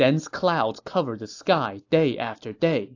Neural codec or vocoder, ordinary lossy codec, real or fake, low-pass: none; AAC, 48 kbps; real; 7.2 kHz